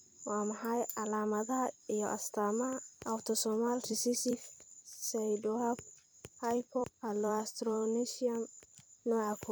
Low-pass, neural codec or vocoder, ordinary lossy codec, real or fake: none; vocoder, 44.1 kHz, 128 mel bands every 256 samples, BigVGAN v2; none; fake